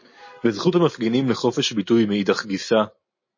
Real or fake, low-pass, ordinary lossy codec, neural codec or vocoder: real; 7.2 kHz; MP3, 32 kbps; none